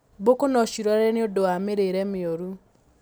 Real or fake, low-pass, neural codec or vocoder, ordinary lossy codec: real; none; none; none